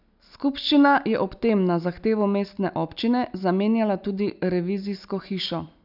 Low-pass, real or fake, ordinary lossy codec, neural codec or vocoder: 5.4 kHz; real; none; none